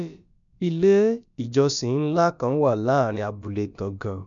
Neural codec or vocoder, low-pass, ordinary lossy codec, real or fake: codec, 16 kHz, about 1 kbps, DyCAST, with the encoder's durations; 7.2 kHz; none; fake